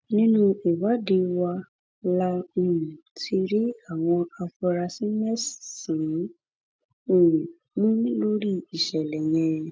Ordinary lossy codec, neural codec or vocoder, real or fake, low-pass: none; none; real; none